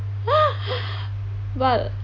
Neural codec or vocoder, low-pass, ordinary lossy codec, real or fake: none; 7.2 kHz; none; real